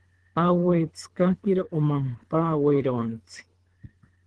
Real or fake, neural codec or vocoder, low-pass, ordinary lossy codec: fake; codec, 24 kHz, 3 kbps, HILCodec; 10.8 kHz; Opus, 16 kbps